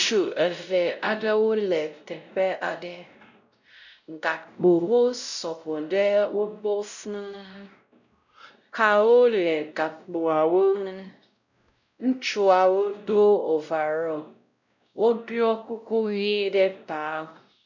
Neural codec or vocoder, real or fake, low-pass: codec, 16 kHz, 0.5 kbps, X-Codec, WavLM features, trained on Multilingual LibriSpeech; fake; 7.2 kHz